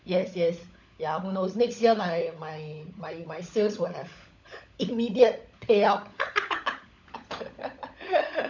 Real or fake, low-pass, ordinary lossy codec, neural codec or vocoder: fake; 7.2 kHz; none; codec, 16 kHz, 16 kbps, FunCodec, trained on LibriTTS, 50 frames a second